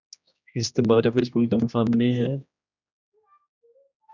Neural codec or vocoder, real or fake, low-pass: codec, 16 kHz, 1 kbps, X-Codec, HuBERT features, trained on general audio; fake; 7.2 kHz